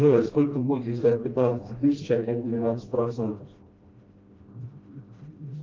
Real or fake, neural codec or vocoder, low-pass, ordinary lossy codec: fake; codec, 16 kHz, 1 kbps, FreqCodec, smaller model; 7.2 kHz; Opus, 24 kbps